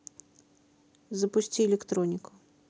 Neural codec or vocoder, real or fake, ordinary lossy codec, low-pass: none; real; none; none